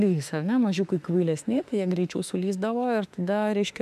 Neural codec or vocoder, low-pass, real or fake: autoencoder, 48 kHz, 32 numbers a frame, DAC-VAE, trained on Japanese speech; 14.4 kHz; fake